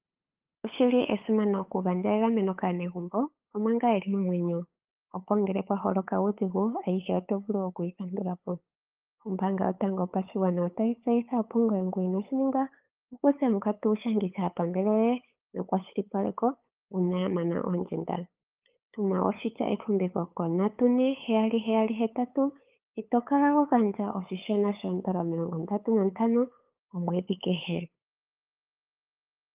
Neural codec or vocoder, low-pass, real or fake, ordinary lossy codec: codec, 16 kHz, 8 kbps, FunCodec, trained on LibriTTS, 25 frames a second; 3.6 kHz; fake; Opus, 32 kbps